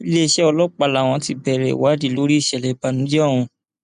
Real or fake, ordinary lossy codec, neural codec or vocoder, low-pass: real; none; none; 9.9 kHz